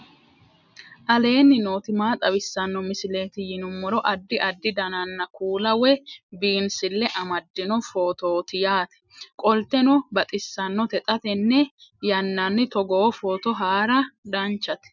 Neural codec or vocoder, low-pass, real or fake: none; 7.2 kHz; real